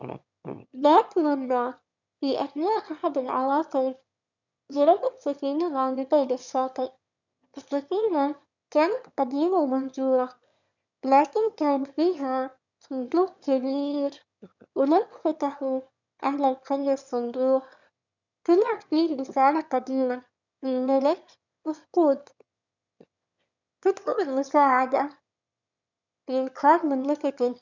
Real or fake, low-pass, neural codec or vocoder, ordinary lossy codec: fake; 7.2 kHz; autoencoder, 22.05 kHz, a latent of 192 numbers a frame, VITS, trained on one speaker; none